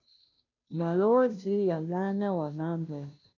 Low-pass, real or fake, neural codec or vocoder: 7.2 kHz; fake; codec, 16 kHz, 0.5 kbps, FunCodec, trained on Chinese and English, 25 frames a second